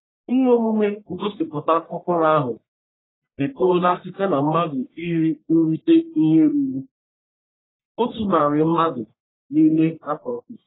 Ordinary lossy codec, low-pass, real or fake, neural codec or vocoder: AAC, 16 kbps; 7.2 kHz; fake; codec, 44.1 kHz, 1.7 kbps, Pupu-Codec